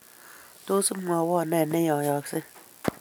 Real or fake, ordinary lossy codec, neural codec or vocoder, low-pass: real; none; none; none